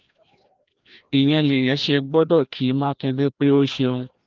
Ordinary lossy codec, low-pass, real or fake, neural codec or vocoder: Opus, 24 kbps; 7.2 kHz; fake; codec, 16 kHz, 1 kbps, FreqCodec, larger model